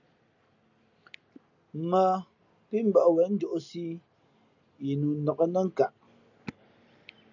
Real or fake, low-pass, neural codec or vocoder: real; 7.2 kHz; none